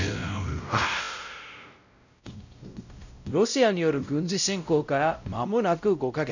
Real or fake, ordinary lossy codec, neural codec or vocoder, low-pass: fake; none; codec, 16 kHz, 0.5 kbps, X-Codec, WavLM features, trained on Multilingual LibriSpeech; 7.2 kHz